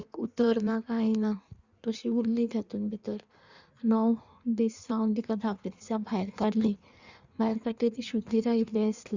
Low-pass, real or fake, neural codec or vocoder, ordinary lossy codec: 7.2 kHz; fake; codec, 16 kHz in and 24 kHz out, 1.1 kbps, FireRedTTS-2 codec; Opus, 64 kbps